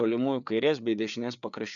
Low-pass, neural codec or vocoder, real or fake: 7.2 kHz; codec, 16 kHz, 4 kbps, FreqCodec, larger model; fake